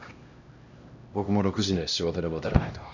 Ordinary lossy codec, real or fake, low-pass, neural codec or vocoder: none; fake; 7.2 kHz; codec, 16 kHz, 1 kbps, X-Codec, HuBERT features, trained on LibriSpeech